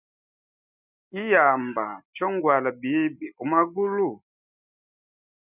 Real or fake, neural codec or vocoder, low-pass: real; none; 3.6 kHz